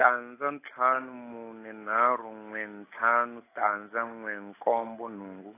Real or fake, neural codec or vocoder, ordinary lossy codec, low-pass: real; none; AAC, 24 kbps; 3.6 kHz